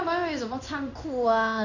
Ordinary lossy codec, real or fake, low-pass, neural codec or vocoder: none; fake; 7.2 kHz; codec, 16 kHz in and 24 kHz out, 1 kbps, XY-Tokenizer